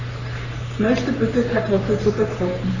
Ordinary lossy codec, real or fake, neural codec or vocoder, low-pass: none; fake; codec, 44.1 kHz, 3.4 kbps, Pupu-Codec; 7.2 kHz